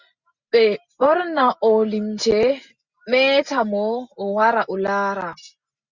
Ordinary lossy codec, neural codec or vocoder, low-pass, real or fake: Opus, 64 kbps; none; 7.2 kHz; real